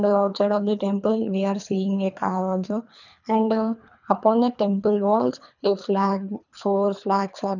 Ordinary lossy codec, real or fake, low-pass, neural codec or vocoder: none; fake; 7.2 kHz; codec, 24 kHz, 3 kbps, HILCodec